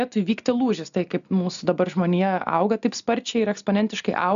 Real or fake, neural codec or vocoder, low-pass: real; none; 7.2 kHz